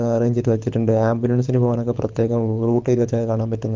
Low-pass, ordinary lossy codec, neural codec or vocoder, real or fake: 7.2 kHz; Opus, 32 kbps; codec, 24 kHz, 6 kbps, HILCodec; fake